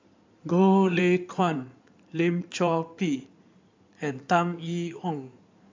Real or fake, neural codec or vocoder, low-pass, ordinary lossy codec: fake; codec, 16 kHz in and 24 kHz out, 2.2 kbps, FireRedTTS-2 codec; 7.2 kHz; MP3, 64 kbps